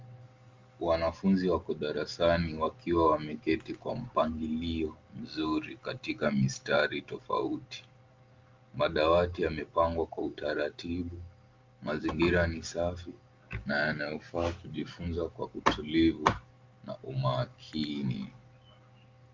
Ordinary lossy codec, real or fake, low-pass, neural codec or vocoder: Opus, 32 kbps; real; 7.2 kHz; none